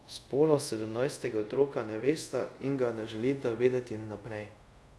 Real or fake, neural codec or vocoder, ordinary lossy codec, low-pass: fake; codec, 24 kHz, 0.5 kbps, DualCodec; none; none